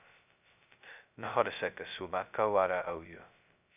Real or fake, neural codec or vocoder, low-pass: fake; codec, 16 kHz, 0.2 kbps, FocalCodec; 3.6 kHz